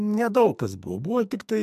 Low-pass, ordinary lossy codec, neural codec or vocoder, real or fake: 14.4 kHz; MP3, 96 kbps; codec, 44.1 kHz, 2.6 kbps, SNAC; fake